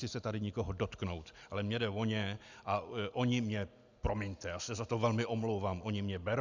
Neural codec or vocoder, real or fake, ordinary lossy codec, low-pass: none; real; Opus, 64 kbps; 7.2 kHz